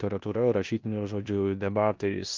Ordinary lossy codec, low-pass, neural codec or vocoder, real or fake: Opus, 24 kbps; 7.2 kHz; codec, 16 kHz in and 24 kHz out, 0.9 kbps, LongCat-Audio-Codec, four codebook decoder; fake